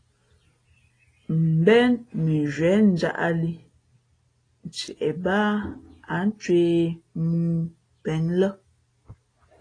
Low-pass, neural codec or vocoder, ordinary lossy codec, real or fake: 9.9 kHz; none; AAC, 32 kbps; real